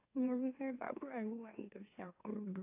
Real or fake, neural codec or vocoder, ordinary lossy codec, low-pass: fake; autoencoder, 44.1 kHz, a latent of 192 numbers a frame, MeloTTS; AAC, 24 kbps; 3.6 kHz